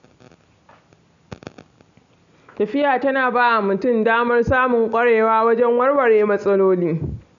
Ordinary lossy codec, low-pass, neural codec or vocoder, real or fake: none; 7.2 kHz; none; real